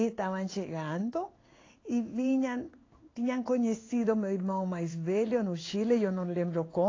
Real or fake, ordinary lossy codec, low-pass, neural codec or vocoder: fake; AAC, 32 kbps; 7.2 kHz; codec, 16 kHz in and 24 kHz out, 1 kbps, XY-Tokenizer